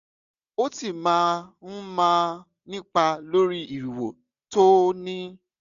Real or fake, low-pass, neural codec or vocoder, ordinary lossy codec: real; 7.2 kHz; none; none